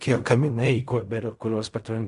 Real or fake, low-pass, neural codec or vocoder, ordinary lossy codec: fake; 10.8 kHz; codec, 16 kHz in and 24 kHz out, 0.4 kbps, LongCat-Audio-Codec, fine tuned four codebook decoder; MP3, 96 kbps